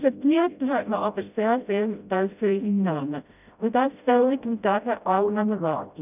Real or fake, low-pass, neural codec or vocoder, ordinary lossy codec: fake; 3.6 kHz; codec, 16 kHz, 0.5 kbps, FreqCodec, smaller model; none